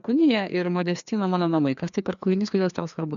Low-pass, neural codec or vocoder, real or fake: 7.2 kHz; codec, 16 kHz, 2 kbps, FreqCodec, larger model; fake